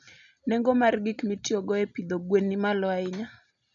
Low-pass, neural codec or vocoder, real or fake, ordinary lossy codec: 7.2 kHz; none; real; none